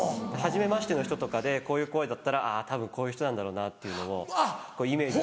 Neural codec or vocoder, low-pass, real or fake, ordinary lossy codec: none; none; real; none